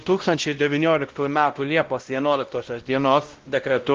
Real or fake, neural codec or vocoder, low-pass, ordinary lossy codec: fake; codec, 16 kHz, 0.5 kbps, X-Codec, WavLM features, trained on Multilingual LibriSpeech; 7.2 kHz; Opus, 16 kbps